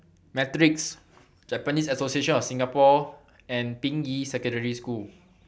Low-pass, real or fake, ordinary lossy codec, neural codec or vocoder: none; real; none; none